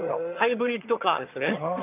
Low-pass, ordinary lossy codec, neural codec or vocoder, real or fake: 3.6 kHz; none; vocoder, 22.05 kHz, 80 mel bands, HiFi-GAN; fake